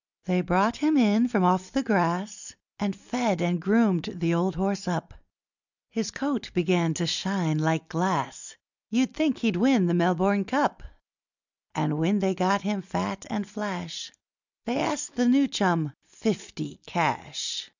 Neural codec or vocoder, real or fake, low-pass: none; real; 7.2 kHz